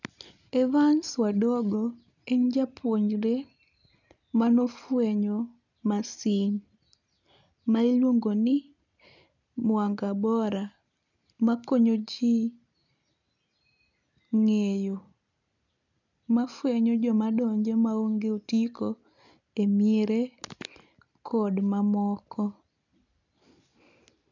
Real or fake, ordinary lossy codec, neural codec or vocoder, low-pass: real; none; none; 7.2 kHz